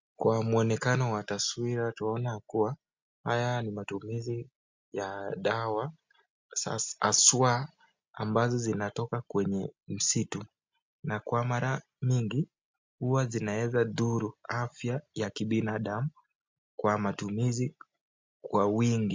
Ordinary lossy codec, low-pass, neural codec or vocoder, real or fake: MP3, 64 kbps; 7.2 kHz; none; real